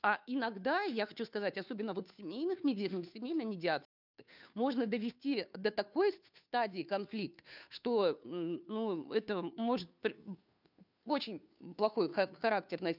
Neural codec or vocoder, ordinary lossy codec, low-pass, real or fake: codec, 16 kHz, 2 kbps, FunCodec, trained on Chinese and English, 25 frames a second; none; 5.4 kHz; fake